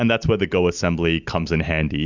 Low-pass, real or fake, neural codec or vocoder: 7.2 kHz; real; none